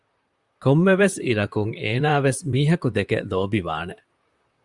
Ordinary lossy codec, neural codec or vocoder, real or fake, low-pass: Opus, 64 kbps; vocoder, 44.1 kHz, 128 mel bands, Pupu-Vocoder; fake; 10.8 kHz